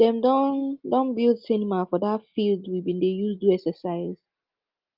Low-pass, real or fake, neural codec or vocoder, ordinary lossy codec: 5.4 kHz; real; none; Opus, 24 kbps